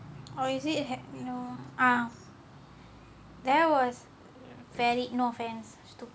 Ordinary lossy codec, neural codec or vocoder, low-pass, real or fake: none; none; none; real